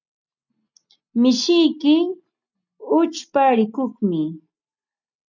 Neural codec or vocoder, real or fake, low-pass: none; real; 7.2 kHz